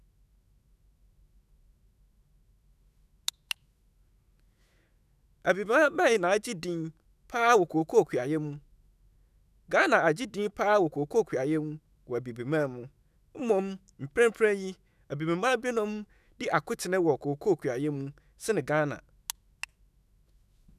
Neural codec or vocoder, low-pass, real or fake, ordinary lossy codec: autoencoder, 48 kHz, 128 numbers a frame, DAC-VAE, trained on Japanese speech; 14.4 kHz; fake; none